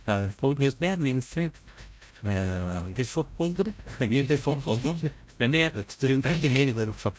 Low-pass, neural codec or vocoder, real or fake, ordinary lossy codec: none; codec, 16 kHz, 0.5 kbps, FreqCodec, larger model; fake; none